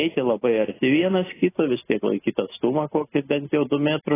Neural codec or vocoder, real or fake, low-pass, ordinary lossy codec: none; real; 3.6 kHz; AAC, 16 kbps